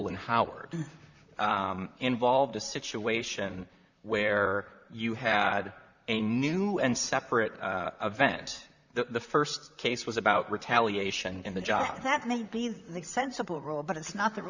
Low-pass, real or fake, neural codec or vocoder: 7.2 kHz; fake; vocoder, 44.1 kHz, 128 mel bands, Pupu-Vocoder